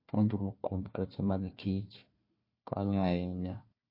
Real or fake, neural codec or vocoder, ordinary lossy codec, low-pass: fake; codec, 16 kHz, 1 kbps, FunCodec, trained on Chinese and English, 50 frames a second; MP3, 32 kbps; 5.4 kHz